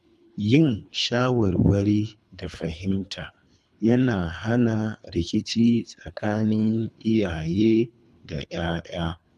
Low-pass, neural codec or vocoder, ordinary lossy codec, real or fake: none; codec, 24 kHz, 3 kbps, HILCodec; none; fake